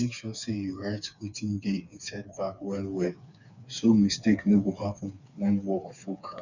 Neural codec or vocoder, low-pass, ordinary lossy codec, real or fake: codec, 16 kHz, 4 kbps, FreqCodec, smaller model; 7.2 kHz; none; fake